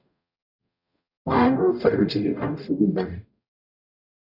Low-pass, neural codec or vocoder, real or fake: 5.4 kHz; codec, 44.1 kHz, 0.9 kbps, DAC; fake